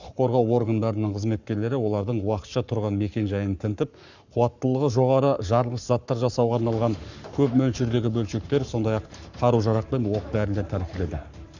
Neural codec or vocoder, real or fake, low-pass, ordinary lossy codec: codec, 44.1 kHz, 7.8 kbps, Pupu-Codec; fake; 7.2 kHz; none